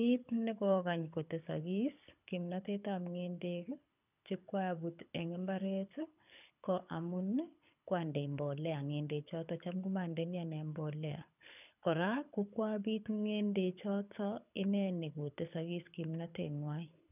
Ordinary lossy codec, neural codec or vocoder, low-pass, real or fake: none; codec, 24 kHz, 6 kbps, HILCodec; 3.6 kHz; fake